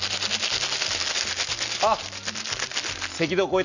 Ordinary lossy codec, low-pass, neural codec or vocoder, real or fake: none; 7.2 kHz; none; real